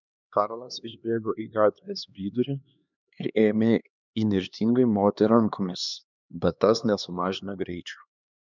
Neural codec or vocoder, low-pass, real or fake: codec, 16 kHz, 2 kbps, X-Codec, HuBERT features, trained on LibriSpeech; 7.2 kHz; fake